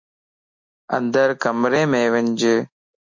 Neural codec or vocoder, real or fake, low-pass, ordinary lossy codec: none; real; 7.2 kHz; MP3, 48 kbps